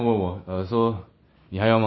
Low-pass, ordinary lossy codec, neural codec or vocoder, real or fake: 7.2 kHz; MP3, 24 kbps; none; real